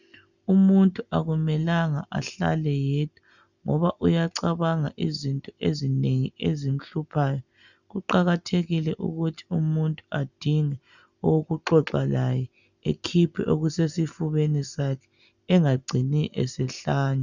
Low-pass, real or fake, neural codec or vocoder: 7.2 kHz; real; none